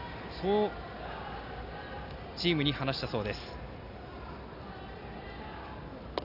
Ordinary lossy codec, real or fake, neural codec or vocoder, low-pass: none; real; none; 5.4 kHz